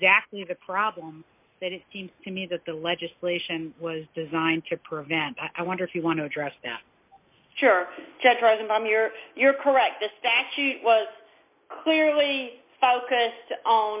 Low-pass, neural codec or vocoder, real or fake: 3.6 kHz; none; real